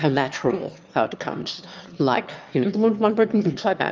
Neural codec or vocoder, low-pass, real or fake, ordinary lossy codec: autoencoder, 22.05 kHz, a latent of 192 numbers a frame, VITS, trained on one speaker; 7.2 kHz; fake; Opus, 32 kbps